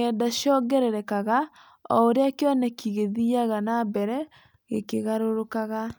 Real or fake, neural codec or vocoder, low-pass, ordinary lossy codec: real; none; none; none